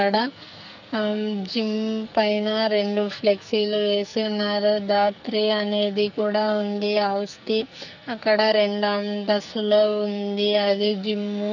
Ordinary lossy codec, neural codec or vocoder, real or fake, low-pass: none; codec, 44.1 kHz, 2.6 kbps, SNAC; fake; 7.2 kHz